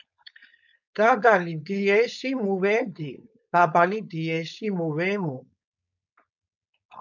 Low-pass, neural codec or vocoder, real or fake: 7.2 kHz; codec, 16 kHz, 4.8 kbps, FACodec; fake